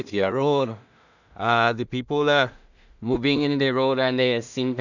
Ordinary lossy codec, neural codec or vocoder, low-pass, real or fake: none; codec, 16 kHz in and 24 kHz out, 0.4 kbps, LongCat-Audio-Codec, two codebook decoder; 7.2 kHz; fake